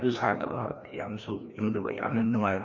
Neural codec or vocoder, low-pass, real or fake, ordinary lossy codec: codec, 16 kHz, 1 kbps, FreqCodec, larger model; 7.2 kHz; fake; MP3, 48 kbps